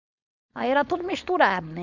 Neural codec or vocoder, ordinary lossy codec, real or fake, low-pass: codec, 16 kHz, 4.8 kbps, FACodec; none; fake; 7.2 kHz